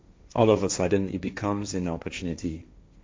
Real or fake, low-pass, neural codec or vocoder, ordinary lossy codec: fake; none; codec, 16 kHz, 1.1 kbps, Voila-Tokenizer; none